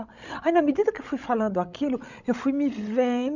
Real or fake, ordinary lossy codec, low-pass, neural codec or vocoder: fake; none; 7.2 kHz; codec, 16 kHz, 16 kbps, FreqCodec, larger model